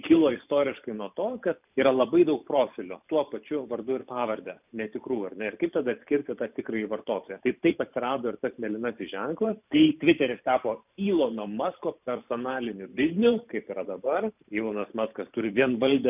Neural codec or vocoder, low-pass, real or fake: vocoder, 44.1 kHz, 128 mel bands every 512 samples, BigVGAN v2; 3.6 kHz; fake